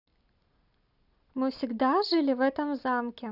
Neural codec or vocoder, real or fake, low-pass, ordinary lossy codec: none; real; 5.4 kHz; none